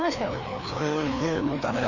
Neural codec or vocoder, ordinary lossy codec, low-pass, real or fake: codec, 16 kHz, 2 kbps, FreqCodec, larger model; none; 7.2 kHz; fake